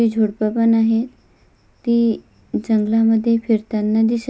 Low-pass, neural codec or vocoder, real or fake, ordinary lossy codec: none; none; real; none